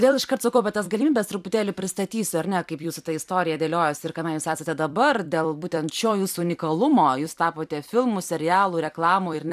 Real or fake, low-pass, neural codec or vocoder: fake; 14.4 kHz; vocoder, 44.1 kHz, 128 mel bands every 256 samples, BigVGAN v2